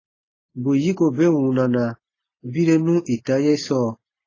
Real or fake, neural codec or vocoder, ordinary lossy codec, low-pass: real; none; AAC, 32 kbps; 7.2 kHz